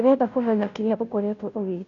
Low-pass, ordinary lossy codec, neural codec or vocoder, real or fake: 7.2 kHz; none; codec, 16 kHz, 0.5 kbps, FunCodec, trained on Chinese and English, 25 frames a second; fake